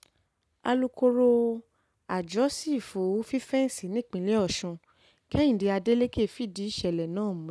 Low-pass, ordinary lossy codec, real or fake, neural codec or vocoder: none; none; real; none